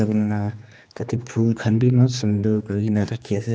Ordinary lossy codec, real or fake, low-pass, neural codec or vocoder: none; fake; none; codec, 16 kHz, 2 kbps, X-Codec, HuBERT features, trained on general audio